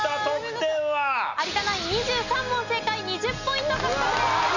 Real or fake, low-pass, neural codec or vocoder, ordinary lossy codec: real; 7.2 kHz; none; none